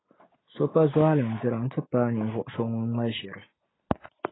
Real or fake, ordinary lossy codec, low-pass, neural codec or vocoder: real; AAC, 16 kbps; 7.2 kHz; none